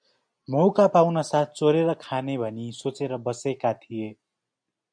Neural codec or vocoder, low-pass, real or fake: none; 9.9 kHz; real